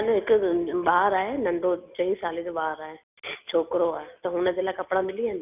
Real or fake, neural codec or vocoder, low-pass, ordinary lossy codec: real; none; 3.6 kHz; none